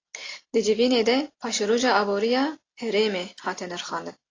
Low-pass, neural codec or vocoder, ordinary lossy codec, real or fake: 7.2 kHz; none; AAC, 32 kbps; real